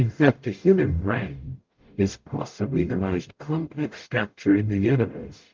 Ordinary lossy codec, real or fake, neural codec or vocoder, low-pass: Opus, 32 kbps; fake; codec, 44.1 kHz, 0.9 kbps, DAC; 7.2 kHz